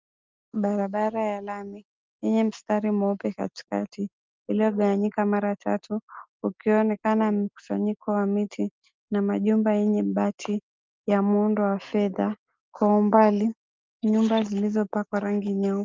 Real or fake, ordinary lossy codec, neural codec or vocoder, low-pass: real; Opus, 24 kbps; none; 7.2 kHz